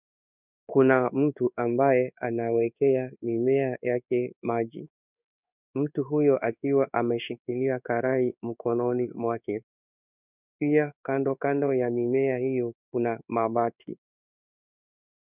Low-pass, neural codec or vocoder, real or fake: 3.6 kHz; codec, 16 kHz in and 24 kHz out, 1 kbps, XY-Tokenizer; fake